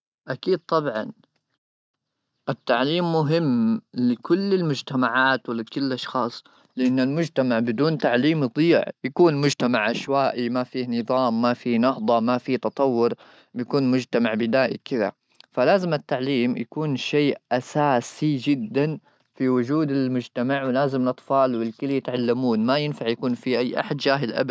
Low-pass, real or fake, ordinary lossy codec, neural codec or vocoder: none; real; none; none